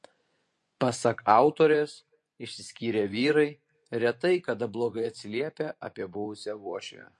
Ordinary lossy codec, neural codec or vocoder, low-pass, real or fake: MP3, 48 kbps; vocoder, 24 kHz, 100 mel bands, Vocos; 10.8 kHz; fake